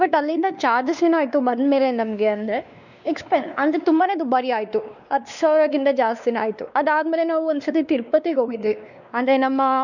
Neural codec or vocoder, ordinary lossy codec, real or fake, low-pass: codec, 16 kHz, 2 kbps, X-Codec, WavLM features, trained on Multilingual LibriSpeech; none; fake; 7.2 kHz